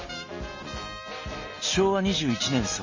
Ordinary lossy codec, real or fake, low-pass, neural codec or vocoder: MP3, 32 kbps; real; 7.2 kHz; none